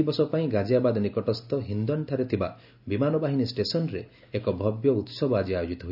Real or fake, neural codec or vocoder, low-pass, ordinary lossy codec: real; none; 5.4 kHz; AAC, 48 kbps